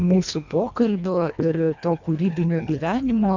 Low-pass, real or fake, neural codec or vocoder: 7.2 kHz; fake; codec, 24 kHz, 1.5 kbps, HILCodec